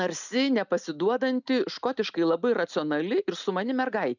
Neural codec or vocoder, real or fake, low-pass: none; real; 7.2 kHz